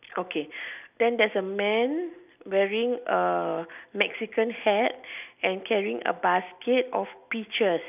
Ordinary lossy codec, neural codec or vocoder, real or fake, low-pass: none; none; real; 3.6 kHz